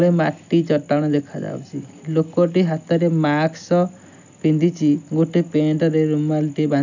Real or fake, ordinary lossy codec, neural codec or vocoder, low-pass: real; none; none; 7.2 kHz